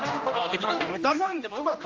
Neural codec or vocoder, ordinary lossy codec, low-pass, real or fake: codec, 16 kHz, 1 kbps, X-Codec, HuBERT features, trained on general audio; Opus, 32 kbps; 7.2 kHz; fake